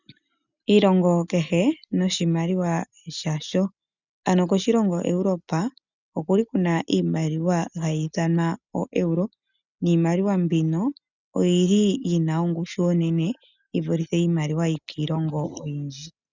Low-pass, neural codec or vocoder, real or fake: 7.2 kHz; none; real